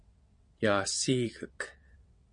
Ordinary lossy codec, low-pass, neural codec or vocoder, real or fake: MP3, 48 kbps; 9.9 kHz; vocoder, 22.05 kHz, 80 mel bands, WaveNeXt; fake